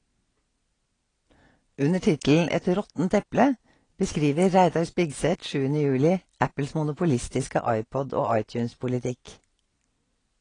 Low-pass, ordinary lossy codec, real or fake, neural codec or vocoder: 9.9 kHz; AAC, 32 kbps; real; none